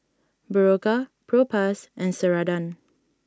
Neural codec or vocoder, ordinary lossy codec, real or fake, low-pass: none; none; real; none